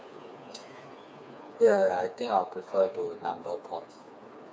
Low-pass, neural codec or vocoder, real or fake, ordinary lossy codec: none; codec, 16 kHz, 4 kbps, FreqCodec, smaller model; fake; none